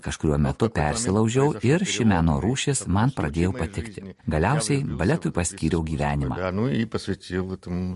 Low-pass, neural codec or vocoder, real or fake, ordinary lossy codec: 14.4 kHz; none; real; MP3, 48 kbps